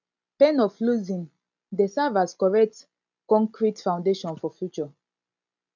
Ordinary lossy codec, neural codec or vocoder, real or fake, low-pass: none; none; real; 7.2 kHz